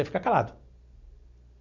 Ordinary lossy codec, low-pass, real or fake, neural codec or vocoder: none; 7.2 kHz; real; none